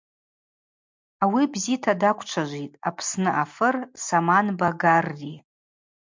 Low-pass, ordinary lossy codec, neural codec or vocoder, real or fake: 7.2 kHz; MP3, 64 kbps; none; real